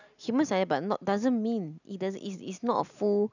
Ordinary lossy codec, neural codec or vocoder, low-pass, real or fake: none; none; 7.2 kHz; real